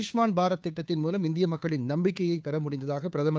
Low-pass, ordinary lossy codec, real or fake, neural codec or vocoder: none; none; fake; codec, 16 kHz, 2 kbps, FunCodec, trained on Chinese and English, 25 frames a second